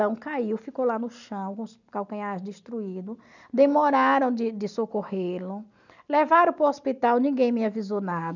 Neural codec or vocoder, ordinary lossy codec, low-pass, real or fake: none; none; 7.2 kHz; real